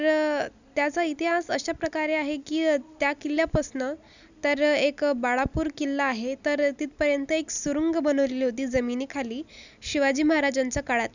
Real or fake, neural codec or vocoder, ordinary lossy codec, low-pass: real; none; none; 7.2 kHz